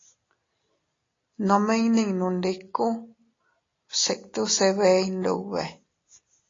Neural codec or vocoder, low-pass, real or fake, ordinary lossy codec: none; 7.2 kHz; real; AAC, 32 kbps